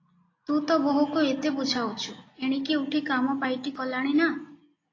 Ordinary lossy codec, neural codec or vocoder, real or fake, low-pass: AAC, 32 kbps; none; real; 7.2 kHz